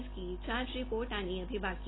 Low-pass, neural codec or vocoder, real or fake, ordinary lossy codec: 7.2 kHz; none; real; AAC, 16 kbps